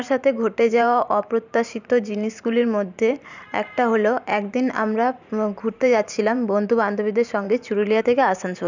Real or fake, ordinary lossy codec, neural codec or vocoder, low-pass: fake; none; vocoder, 44.1 kHz, 128 mel bands every 512 samples, BigVGAN v2; 7.2 kHz